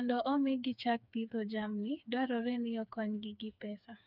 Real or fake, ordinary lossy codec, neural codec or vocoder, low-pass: fake; none; codec, 16 kHz, 4 kbps, FreqCodec, smaller model; 5.4 kHz